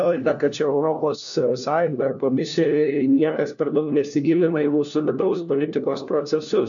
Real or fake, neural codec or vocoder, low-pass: fake; codec, 16 kHz, 1 kbps, FunCodec, trained on LibriTTS, 50 frames a second; 7.2 kHz